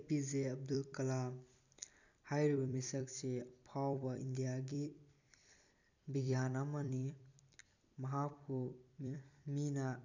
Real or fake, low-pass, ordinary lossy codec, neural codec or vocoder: fake; 7.2 kHz; none; vocoder, 44.1 kHz, 128 mel bands every 256 samples, BigVGAN v2